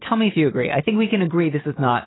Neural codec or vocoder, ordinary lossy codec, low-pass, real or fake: none; AAC, 16 kbps; 7.2 kHz; real